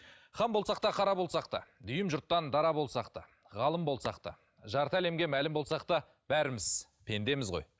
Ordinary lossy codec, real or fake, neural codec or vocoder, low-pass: none; real; none; none